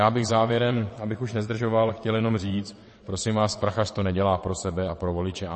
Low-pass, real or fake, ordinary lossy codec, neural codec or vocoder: 9.9 kHz; fake; MP3, 32 kbps; vocoder, 22.05 kHz, 80 mel bands, WaveNeXt